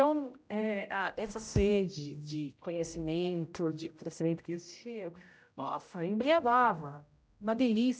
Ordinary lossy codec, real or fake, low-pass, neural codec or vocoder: none; fake; none; codec, 16 kHz, 0.5 kbps, X-Codec, HuBERT features, trained on general audio